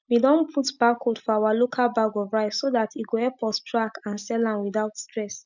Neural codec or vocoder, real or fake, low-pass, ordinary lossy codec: none; real; 7.2 kHz; none